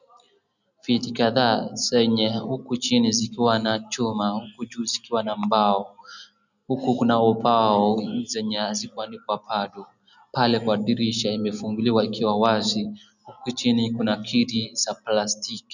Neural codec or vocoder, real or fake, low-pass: none; real; 7.2 kHz